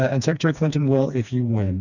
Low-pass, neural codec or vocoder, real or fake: 7.2 kHz; codec, 16 kHz, 2 kbps, FreqCodec, smaller model; fake